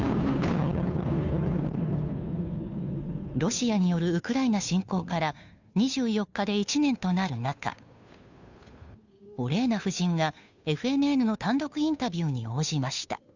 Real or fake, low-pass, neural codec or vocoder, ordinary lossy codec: fake; 7.2 kHz; codec, 16 kHz, 2 kbps, FunCodec, trained on Chinese and English, 25 frames a second; none